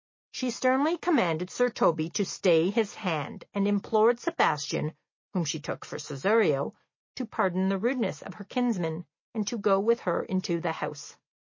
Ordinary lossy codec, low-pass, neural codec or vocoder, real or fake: MP3, 32 kbps; 7.2 kHz; none; real